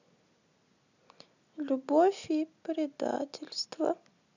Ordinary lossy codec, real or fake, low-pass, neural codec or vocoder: none; real; 7.2 kHz; none